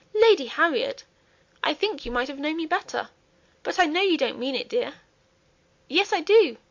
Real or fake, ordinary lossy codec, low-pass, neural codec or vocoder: real; MP3, 48 kbps; 7.2 kHz; none